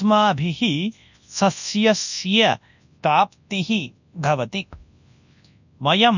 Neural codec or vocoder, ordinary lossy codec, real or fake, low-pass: codec, 24 kHz, 0.9 kbps, WavTokenizer, large speech release; none; fake; 7.2 kHz